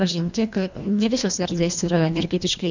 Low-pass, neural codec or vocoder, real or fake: 7.2 kHz; codec, 24 kHz, 1.5 kbps, HILCodec; fake